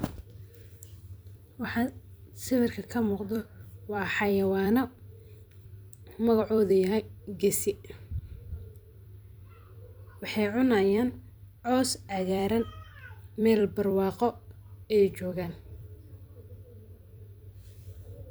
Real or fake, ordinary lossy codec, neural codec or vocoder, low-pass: fake; none; vocoder, 44.1 kHz, 128 mel bands every 256 samples, BigVGAN v2; none